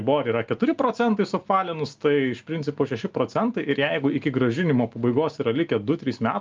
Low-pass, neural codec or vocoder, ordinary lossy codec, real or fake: 7.2 kHz; none; Opus, 24 kbps; real